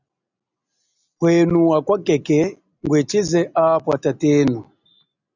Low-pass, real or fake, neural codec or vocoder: 7.2 kHz; real; none